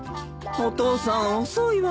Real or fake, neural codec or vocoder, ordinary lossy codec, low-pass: real; none; none; none